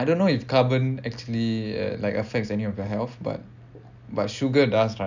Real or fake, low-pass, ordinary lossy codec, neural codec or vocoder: real; 7.2 kHz; none; none